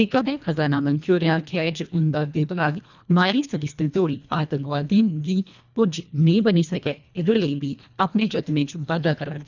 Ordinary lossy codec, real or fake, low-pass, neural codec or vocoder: none; fake; 7.2 kHz; codec, 24 kHz, 1.5 kbps, HILCodec